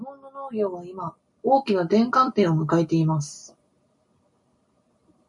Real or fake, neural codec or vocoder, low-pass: real; none; 10.8 kHz